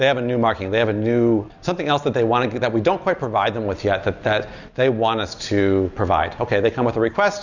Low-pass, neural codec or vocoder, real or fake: 7.2 kHz; none; real